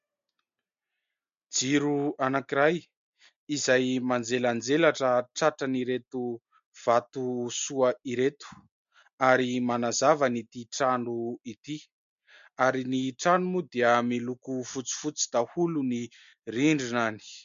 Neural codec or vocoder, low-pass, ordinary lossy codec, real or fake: none; 7.2 kHz; MP3, 48 kbps; real